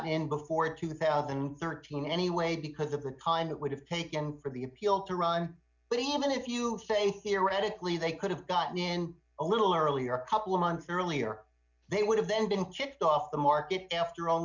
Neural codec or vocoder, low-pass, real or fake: none; 7.2 kHz; real